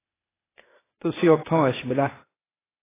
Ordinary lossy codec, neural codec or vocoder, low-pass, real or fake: AAC, 16 kbps; codec, 16 kHz, 0.8 kbps, ZipCodec; 3.6 kHz; fake